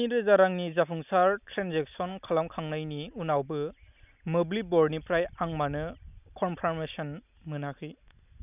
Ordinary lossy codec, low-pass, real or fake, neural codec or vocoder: none; 3.6 kHz; real; none